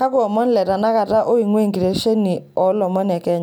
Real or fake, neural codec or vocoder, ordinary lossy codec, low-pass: real; none; none; none